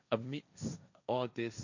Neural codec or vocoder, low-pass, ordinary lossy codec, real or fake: codec, 16 kHz, 1.1 kbps, Voila-Tokenizer; 7.2 kHz; none; fake